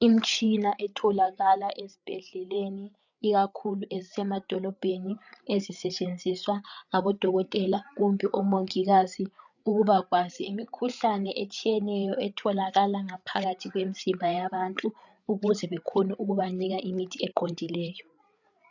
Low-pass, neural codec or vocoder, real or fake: 7.2 kHz; codec, 16 kHz, 8 kbps, FreqCodec, larger model; fake